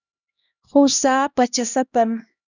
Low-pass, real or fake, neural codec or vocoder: 7.2 kHz; fake; codec, 16 kHz, 1 kbps, X-Codec, HuBERT features, trained on LibriSpeech